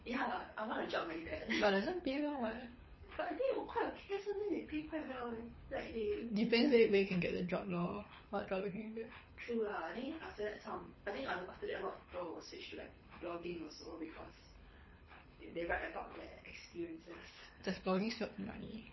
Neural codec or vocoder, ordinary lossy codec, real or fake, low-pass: codec, 24 kHz, 6 kbps, HILCodec; MP3, 24 kbps; fake; 7.2 kHz